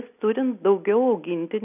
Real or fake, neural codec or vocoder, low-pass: real; none; 3.6 kHz